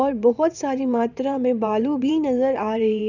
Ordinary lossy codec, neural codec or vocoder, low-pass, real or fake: none; vocoder, 22.05 kHz, 80 mel bands, WaveNeXt; 7.2 kHz; fake